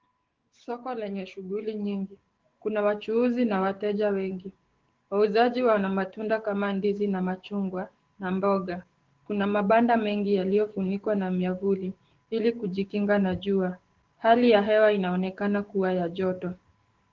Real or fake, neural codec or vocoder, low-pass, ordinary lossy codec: fake; codec, 16 kHz, 6 kbps, DAC; 7.2 kHz; Opus, 16 kbps